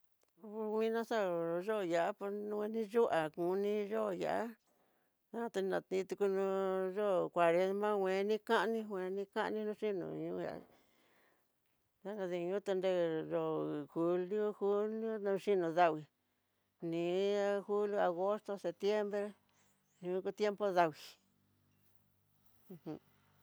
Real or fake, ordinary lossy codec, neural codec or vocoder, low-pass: real; none; none; none